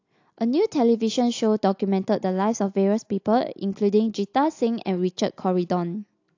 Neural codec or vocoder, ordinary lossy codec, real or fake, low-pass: none; AAC, 48 kbps; real; 7.2 kHz